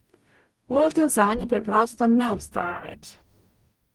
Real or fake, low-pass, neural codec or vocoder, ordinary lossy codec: fake; 19.8 kHz; codec, 44.1 kHz, 0.9 kbps, DAC; Opus, 32 kbps